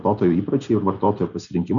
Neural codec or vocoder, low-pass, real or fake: none; 7.2 kHz; real